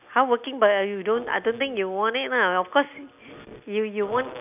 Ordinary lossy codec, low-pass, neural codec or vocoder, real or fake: none; 3.6 kHz; none; real